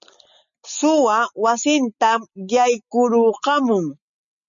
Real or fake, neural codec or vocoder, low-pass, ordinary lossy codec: real; none; 7.2 kHz; MP3, 64 kbps